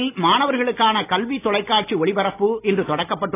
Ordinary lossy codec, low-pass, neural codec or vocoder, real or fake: AAC, 16 kbps; 3.6 kHz; none; real